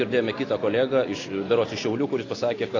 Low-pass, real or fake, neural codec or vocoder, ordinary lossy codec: 7.2 kHz; real; none; AAC, 32 kbps